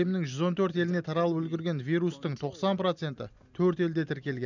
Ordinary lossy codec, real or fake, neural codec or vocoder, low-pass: none; real; none; 7.2 kHz